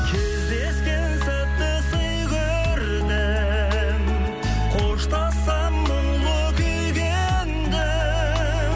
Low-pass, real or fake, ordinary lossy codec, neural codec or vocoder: none; real; none; none